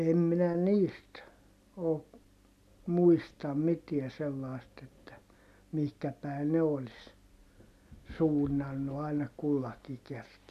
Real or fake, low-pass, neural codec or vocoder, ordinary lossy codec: real; 14.4 kHz; none; none